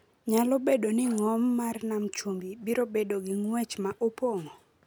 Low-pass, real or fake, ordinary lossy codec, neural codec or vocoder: none; real; none; none